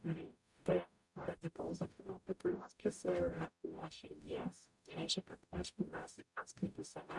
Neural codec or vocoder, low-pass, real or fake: codec, 44.1 kHz, 0.9 kbps, DAC; 10.8 kHz; fake